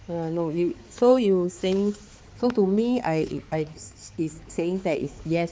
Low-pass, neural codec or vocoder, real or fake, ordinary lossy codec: none; codec, 16 kHz, 4 kbps, X-Codec, HuBERT features, trained on balanced general audio; fake; none